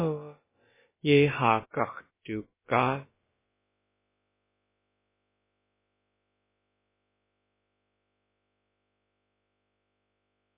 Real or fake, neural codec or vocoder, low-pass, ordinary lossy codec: fake; codec, 16 kHz, about 1 kbps, DyCAST, with the encoder's durations; 3.6 kHz; MP3, 16 kbps